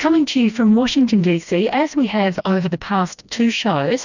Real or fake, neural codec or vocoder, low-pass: fake; codec, 16 kHz, 1 kbps, FreqCodec, smaller model; 7.2 kHz